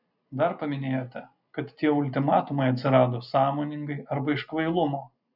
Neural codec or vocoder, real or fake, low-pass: none; real; 5.4 kHz